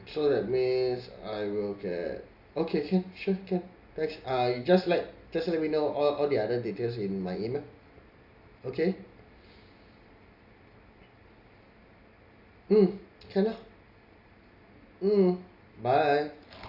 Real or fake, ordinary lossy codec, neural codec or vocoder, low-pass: real; Opus, 64 kbps; none; 5.4 kHz